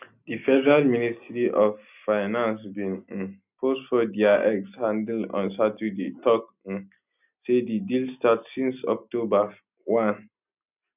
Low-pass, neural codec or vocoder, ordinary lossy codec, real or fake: 3.6 kHz; none; none; real